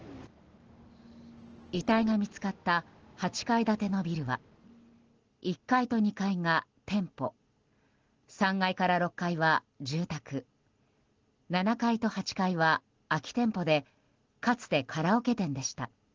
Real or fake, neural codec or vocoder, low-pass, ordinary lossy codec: real; none; 7.2 kHz; Opus, 16 kbps